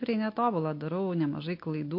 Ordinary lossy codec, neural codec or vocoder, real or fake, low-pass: MP3, 32 kbps; none; real; 5.4 kHz